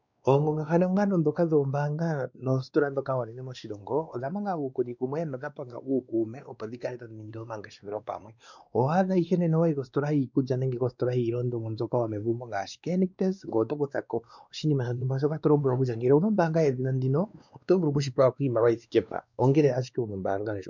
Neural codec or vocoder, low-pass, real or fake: codec, 16 kHz, 2 kbps, X-Codec, WavLM features, trained on Multilingual LibriSpeech; 7.2 kHz; fake